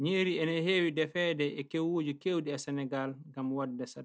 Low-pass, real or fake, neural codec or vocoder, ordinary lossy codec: none; real; none; none